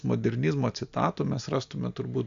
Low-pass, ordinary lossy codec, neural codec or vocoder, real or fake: 7.2 kHz; AAC, 64 kbps; none; real